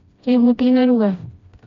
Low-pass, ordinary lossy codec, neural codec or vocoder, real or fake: 7.2 kHz; MP3, 48 kbps; codec, 16 kHz, 1 kbps, FreqCodec, smaller model; fake